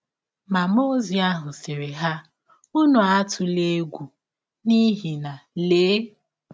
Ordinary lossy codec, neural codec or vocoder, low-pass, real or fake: none; none; none; real